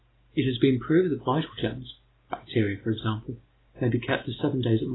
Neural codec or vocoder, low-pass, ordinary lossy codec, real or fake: none; 7.2 kHz; AAC, 16 kbps; real